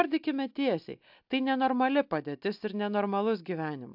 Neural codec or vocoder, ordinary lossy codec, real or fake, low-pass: none; AAC, 48 kbps; real; 5.4 kHz